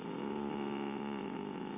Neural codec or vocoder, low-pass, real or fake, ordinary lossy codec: none; 3.6 kHz; real; none